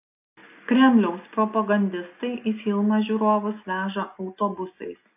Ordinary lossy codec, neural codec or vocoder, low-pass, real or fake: AAC, 32 kbps; none; 3.6 kHz; real